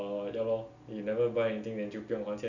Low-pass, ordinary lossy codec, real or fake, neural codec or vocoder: 7.2 kHz; none; real; none